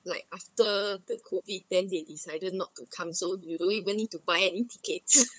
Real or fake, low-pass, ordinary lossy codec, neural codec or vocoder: fake; none; none; codec, 16 kHz, 8 kbps, FunCodec, trained on LibriTTS, 25 frames a second